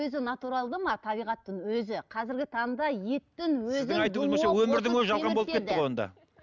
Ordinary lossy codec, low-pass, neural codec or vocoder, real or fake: none; 7.2 kHz; none; real